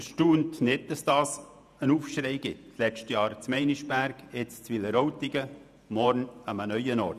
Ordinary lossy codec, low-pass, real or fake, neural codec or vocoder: none; 14.4 kHz; fake; vocoder, 48 kHz, 128 mel bands, Vocos